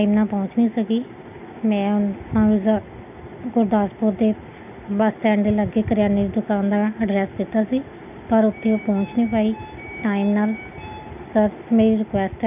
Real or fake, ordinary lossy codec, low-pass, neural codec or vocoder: real; none; 3.6 kHz; none